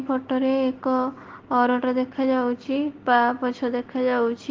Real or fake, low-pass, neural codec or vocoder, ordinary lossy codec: real; 7.2 kHz; none; Opus, 16 kbps